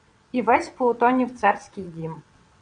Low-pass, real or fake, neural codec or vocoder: 9.9 kHz; fake; vocoder, 22.05 kHz, 80 mel bands, WaveNeXt